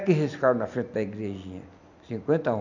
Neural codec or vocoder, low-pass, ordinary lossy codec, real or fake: none; 7.2 kHz; none; real